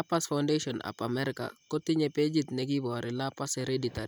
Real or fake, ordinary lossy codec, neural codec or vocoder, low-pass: real; none; none; none